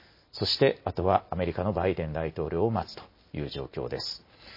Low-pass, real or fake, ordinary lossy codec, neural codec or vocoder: 5.4 kHz; real; MP3, 24 kbps; none